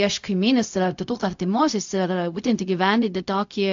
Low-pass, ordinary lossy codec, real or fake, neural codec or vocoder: 7.2 kHz; AAC, 64 kbps; fake; codec, 16 kHz, 0.4 kbps, LongCat-Audio-Codec